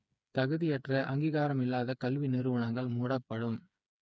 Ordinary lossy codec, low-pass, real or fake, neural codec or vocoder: none; none; fake; codec, 16 kHz, 4 kbps, FreqCodec, smaller model